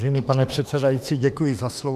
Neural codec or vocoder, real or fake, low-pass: autoencoder, 48 kHz, 32 numbers a frame, DAC-VAE, trained on Japanese speech; fake; 14.4 kHz